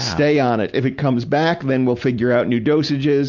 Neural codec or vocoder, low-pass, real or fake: none; 7.2 kHz; real